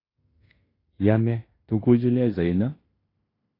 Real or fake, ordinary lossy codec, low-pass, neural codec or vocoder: fake; AAC, 24 kbps; 5.4 kHz; codec, 16 kHz in and 24 kHz out, 0.9 kbps, LongCat-Audio-Codec, fine tuned four codebook decoder